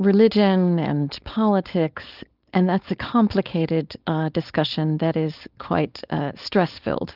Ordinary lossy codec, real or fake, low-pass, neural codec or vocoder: Opus, 32 kbps; fake; 5.4 kHz; codec, 16 kHz, 4.8 kbps, FACodec